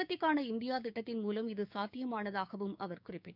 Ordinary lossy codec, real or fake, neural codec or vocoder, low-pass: none; fake; codec, 16 kHz, 6 kbps, DAC; 5.4 kHz